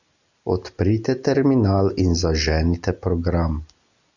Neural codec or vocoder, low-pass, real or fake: none; 7.2 kHz; real